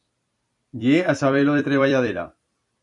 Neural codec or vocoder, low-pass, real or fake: vocoder, 44.1 kHz, 128 mel bands every 256 samples, BigVGAN v2; 10.8 kHz; fake